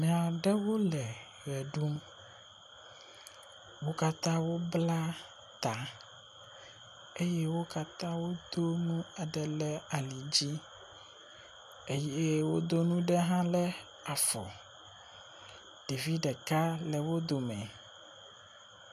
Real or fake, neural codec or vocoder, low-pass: real; none; 14.4 kHz